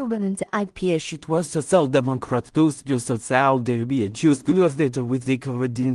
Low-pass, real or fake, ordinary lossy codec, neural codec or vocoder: 10.8 kHz; fake; Opus, 24 kbps; codec, 16 kHz in and 24 kHz out, 0.4 kbps, LongCat-Audio-Codec, two codebook decoder